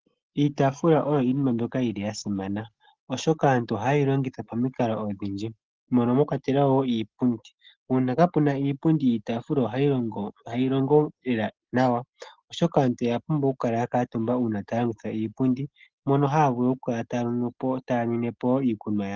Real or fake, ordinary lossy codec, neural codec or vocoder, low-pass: real; Opus, 16 kbps; none; 7.2 kHz